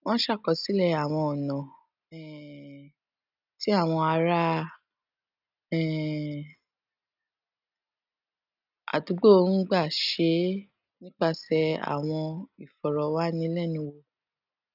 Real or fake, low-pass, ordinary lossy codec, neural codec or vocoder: real; 5.4 kHz; none; none